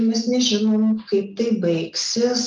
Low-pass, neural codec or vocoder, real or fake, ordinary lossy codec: 7.2 kHz; none; real; Opus, 24 kbps